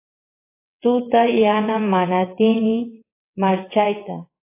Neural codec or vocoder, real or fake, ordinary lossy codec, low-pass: vocoder, 22.05 kHz, 80 mel bands, WaveNeXt; fake; AAC, 24 kbps; 3.6 kHz